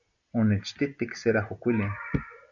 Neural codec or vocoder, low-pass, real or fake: none; 7.2 kHz; real